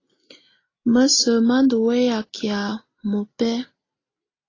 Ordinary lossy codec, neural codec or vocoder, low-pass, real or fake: AAC, 32 kbps; none; 7.2 kHz; real